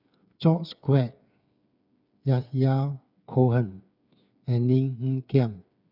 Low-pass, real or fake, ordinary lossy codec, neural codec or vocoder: 5.4 kHz; fake; none; codec, 16 kHz, 8 kbps, FreqCodec, smaller model